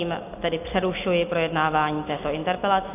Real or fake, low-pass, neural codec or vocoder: real; 3.6 kHz; none